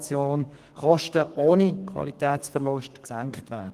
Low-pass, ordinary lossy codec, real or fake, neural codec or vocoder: 14.4 kHz; Opus, 24 kbps; fake; codec, 32 kHz, 1.9 kbps, SNAC